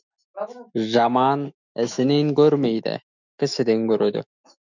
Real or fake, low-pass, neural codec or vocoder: fake; 7.2 kHz; autoencoder, 48 kHz, 128 numbers a frame, DAC-VAE, trained on Japanese speech